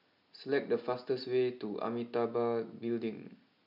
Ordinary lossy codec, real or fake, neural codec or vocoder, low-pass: none; real; none; 5.4 kHz